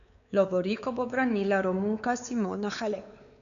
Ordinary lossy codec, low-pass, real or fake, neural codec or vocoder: none; 7.2 kHz; fake; codec, 16 kHz, 4 kbps, X-Codec, WavLM features, trained on Multilingual LibriSpeech